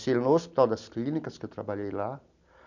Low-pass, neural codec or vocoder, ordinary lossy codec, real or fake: 7.2 kHz; none; none; real